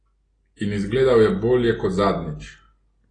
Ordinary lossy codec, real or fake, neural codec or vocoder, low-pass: AAC, 32 kbps; real; none; 9.9 kHz